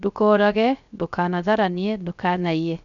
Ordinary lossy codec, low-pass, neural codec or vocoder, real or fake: none; 7.2 kHz; codec, 16 kHz, 0.3 kbps, FocalCodec; fake